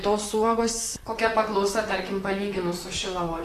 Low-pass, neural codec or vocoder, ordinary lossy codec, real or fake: 14.4 kHz; vocoder, 44.1 kHz, 128 mel bands, Pupu-Vocoder; AAC, 48 kbps; fake